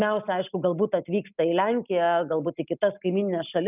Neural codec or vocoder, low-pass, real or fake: none; 3.6 kHz; real